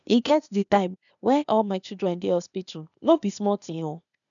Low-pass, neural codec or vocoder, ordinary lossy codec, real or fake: 7.2 kHz; codec, 16 kHz, 0.8 kbps, ZipCodec; none; fake